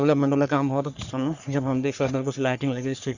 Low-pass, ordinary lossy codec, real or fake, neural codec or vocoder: 7.2 kHz; none; fake; codec, 44.1 kHz, 3.4 kbps, Pupu-Codec